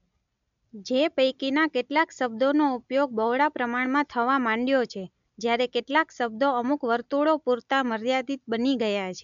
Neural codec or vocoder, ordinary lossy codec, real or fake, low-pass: none; MP3, 64 kbps; real; 7.2 kHz